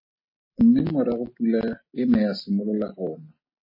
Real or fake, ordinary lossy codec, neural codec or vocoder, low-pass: real; MP3, 24 kbps; none; 5.4 kHz